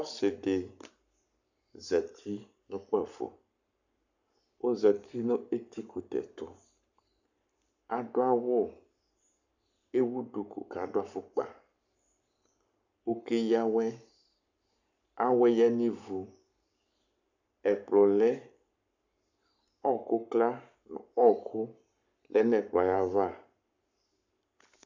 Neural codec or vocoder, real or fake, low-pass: codec, 44.1 kHz, 7.8 kbps, Pupu-Codec; fake; 7.2 kHz